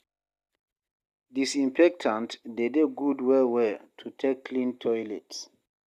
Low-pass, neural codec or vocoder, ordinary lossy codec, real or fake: 14.4 kHz; none; Opus, 64 kbps; real